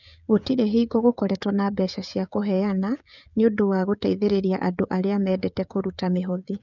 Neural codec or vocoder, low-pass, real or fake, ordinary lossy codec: codec, 16 kHz, 16 kbps, FreqCodec, smaller model; 7.2 kHz; fake; none